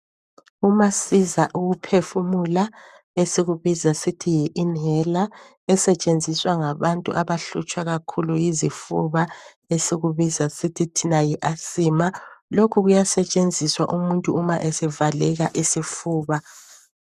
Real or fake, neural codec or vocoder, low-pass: fake; codec, 44.1 kHz, 7.8 kbps, Pupu-Codec; 14.4 kHz